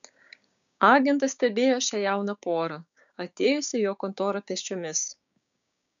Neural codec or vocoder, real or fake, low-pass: codec, 16 kHz, 6 kbps, DAC; fake; 7.2 kHz